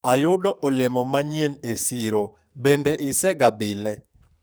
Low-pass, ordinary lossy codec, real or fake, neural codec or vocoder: none; none; fake; codec, 44.1 kHz, 2.6 kbps, SNAC